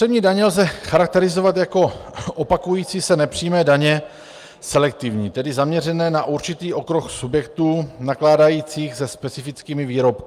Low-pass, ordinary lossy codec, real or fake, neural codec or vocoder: 14.4 kHz; Opus, 32 kbps; real; none